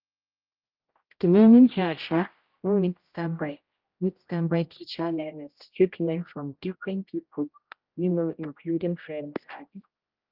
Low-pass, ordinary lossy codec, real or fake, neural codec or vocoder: 5.4 kHz; Opus, 24 kbps; fake; codec, 16 kHz, 0.5 kbps, X-Codec, HuBERT features, trained on general audio